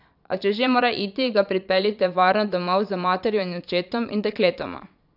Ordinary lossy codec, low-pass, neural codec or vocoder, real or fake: none; 5.4 kHz; vocoder, 22.05 kHz, 80 mel bands, Vocos; fake